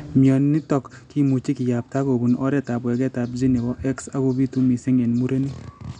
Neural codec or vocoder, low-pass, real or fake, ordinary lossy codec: none; 9.9 kHz; real; none